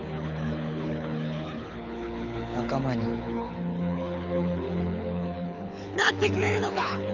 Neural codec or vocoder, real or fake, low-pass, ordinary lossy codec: codec, 24 kHz, 6 kbps, HILCodec; fake; 7.2 kHz; none